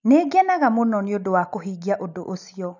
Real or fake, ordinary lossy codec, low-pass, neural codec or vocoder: real; none; 7.2 kHz; none